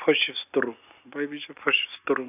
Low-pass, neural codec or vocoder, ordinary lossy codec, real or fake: 3.6 kHz; none; none; real